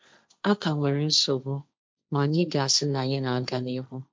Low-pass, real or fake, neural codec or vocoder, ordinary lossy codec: none; fake; codec, 16 kHz, 1.1 kbps, Voila-Tokenizer; none